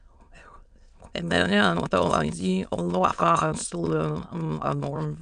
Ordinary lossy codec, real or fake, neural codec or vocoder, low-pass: MP3, 96 kbps; fake; autoencoder, 22.05 kHz, a latent of 192 numbers a frame, VITS, trained on many speakers; 9.9 kHz